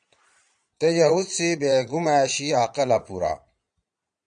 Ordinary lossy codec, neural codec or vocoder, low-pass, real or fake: MP3, 96 kbps; vocoder, 22.05 kHz, 80 mel bands, Vocos; 9.9 kHz; fake